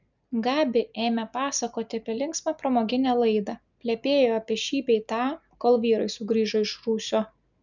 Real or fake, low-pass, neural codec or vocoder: real; 7.2 kHz; none